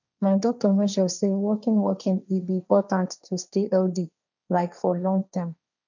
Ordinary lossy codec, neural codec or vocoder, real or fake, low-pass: none; codec, 16 kHz, 1.1 kbps, Voila-Tokenizer; fake; 7.2 kHz